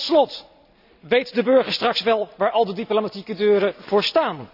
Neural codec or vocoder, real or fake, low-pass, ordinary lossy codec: none; real; 5.4 kHz; none